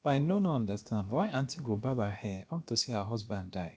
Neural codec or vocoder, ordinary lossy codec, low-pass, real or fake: codec, 16 kHz, about 1 kbps, DyCAST, with the encoder's durations; none; none; fake